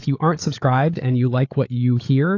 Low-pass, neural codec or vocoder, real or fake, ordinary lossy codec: 7.2 kHz; codec, 16 kHz, 8 kbps, FreqCodec, larger model; fake; AAC, 48 kbps